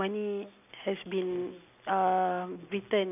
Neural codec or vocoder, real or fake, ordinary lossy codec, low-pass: none; real; none; 3.6 kHz